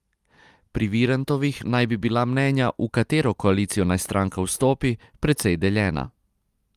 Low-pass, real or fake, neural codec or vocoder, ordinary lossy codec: 14.4 kHz; real; none; Opus, 32 kbps